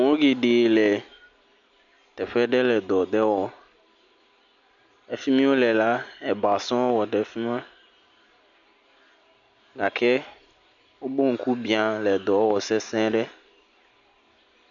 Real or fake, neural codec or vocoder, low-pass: real; none; 7.2 kHz